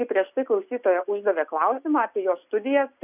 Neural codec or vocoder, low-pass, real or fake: none; 3.6 kHz; real